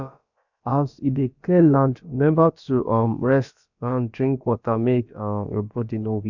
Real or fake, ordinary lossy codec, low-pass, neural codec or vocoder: fake; none; 7.2 kHz; codec, 16 kHz, about 1 kbps, DyCAST, with the encoder's durations